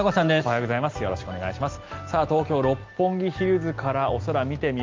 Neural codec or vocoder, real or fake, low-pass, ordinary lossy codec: none; real; 7.2 kHz; Opus, 24 kbps